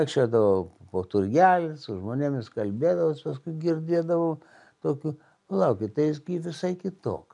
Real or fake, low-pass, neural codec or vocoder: real; 10.8 kHz; none